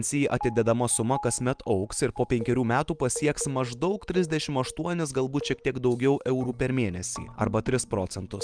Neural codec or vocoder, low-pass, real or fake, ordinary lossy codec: none; 9.9 kHz; real; Opus, 32 kbps